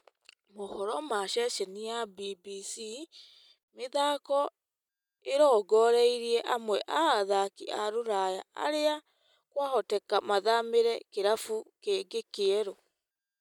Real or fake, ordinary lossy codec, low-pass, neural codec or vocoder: real; none; 19.8 kHz; none